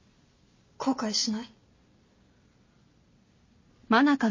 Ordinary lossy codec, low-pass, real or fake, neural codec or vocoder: MP3, 32 kbps; 7.2 kHz; real; none